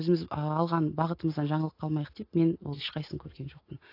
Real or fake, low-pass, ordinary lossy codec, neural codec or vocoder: real; 5.4 kHz; AAC, 32 kbps; none